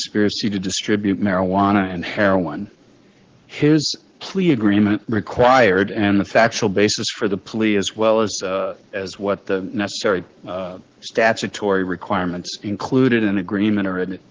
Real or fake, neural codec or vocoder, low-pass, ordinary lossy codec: fake; codec, 44.1 kHz, 7.8 kbps, Pupu-Codec; 7.2 kHz; Opus, 16 kbps